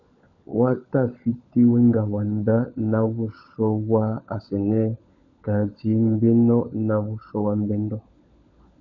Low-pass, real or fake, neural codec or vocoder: 7.2 kHz; fake; codec, 16 kHz, 16 kbps, FunCodec, trained on LibriTTS, 50 frames a second